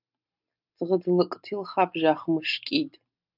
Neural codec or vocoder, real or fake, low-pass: none; real; 5.4 kHz